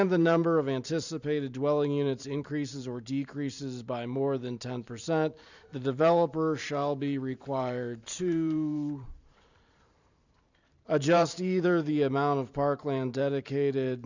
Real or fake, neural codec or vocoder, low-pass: fake; vocoder, 44.1 kHz, 128 mel bands every 512 samples, BigVGAN v2; 7.2 kHz